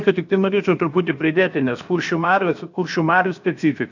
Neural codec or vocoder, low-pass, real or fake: codec, 16 kHz, 0.7 kbps, FocalCodec; 7.2 kHz; fake